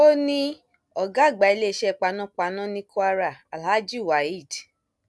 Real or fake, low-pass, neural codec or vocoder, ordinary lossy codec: real; none; none; none